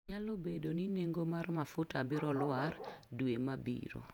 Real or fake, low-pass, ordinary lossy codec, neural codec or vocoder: fake; 19.8 kHz; none; vocoder, 48 kHz, 128 mel bands, Vocos